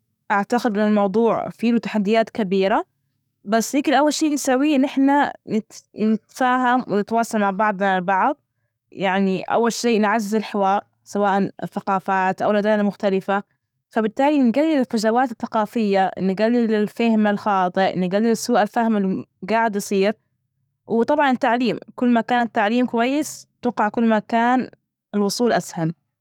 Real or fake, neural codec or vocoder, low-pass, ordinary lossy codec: fake; codec, 44.1 kHz, 7.8 kbps, DAC; 19.8 kHz; none